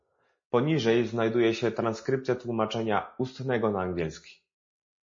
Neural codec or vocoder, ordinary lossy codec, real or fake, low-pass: none; MP3, 32 kbps; real; 7.2 kHz